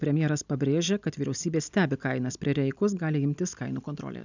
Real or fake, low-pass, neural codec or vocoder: real; 7.2 kHz; none